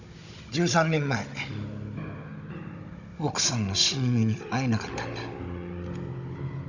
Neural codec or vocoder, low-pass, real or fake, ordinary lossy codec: codec, 16 kHz, 16 kbps, FunCodec, trained on Chinese and English, 50 frames a second; 7.2 kHz; fake; none